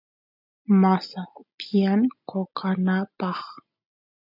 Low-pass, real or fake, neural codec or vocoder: 5.4 kHz; real; none